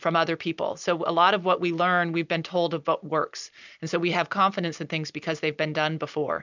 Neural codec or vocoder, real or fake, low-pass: none; real; 7.2 kHz